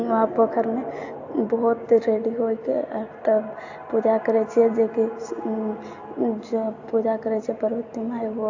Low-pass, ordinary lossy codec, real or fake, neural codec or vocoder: 7.2 kHz; AAC, 48 kbps; real; none